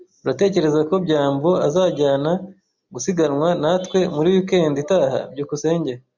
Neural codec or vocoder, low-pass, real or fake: none; 7.2 kHz; real